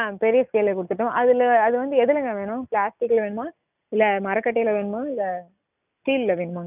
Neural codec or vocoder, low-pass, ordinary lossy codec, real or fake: none; 3.6 kHz; none; real